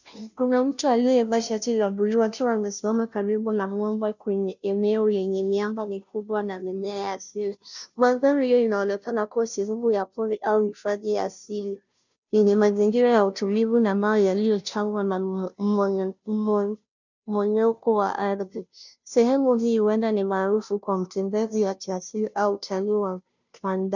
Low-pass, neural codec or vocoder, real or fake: 7.2 kHz; codec, 16 kHz, 0.5 kbps, FunCodec, trained on Chinese and English, 25 frames a second; fake